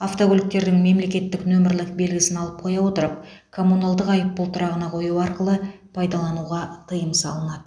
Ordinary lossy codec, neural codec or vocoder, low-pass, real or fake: none; none; 9.9 kHz; real